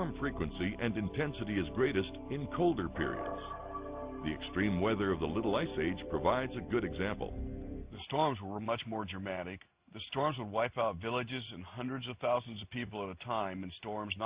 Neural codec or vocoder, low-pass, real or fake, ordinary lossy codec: none; 3.6 kHz; real; Opus, 64 kbps